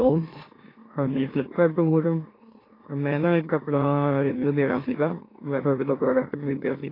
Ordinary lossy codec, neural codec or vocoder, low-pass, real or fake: AAC, 24 kbps; autoencoder, 44.1 kHz, a latent of 192 numbers a frame, MeloTTS; 5.4 kHz; fake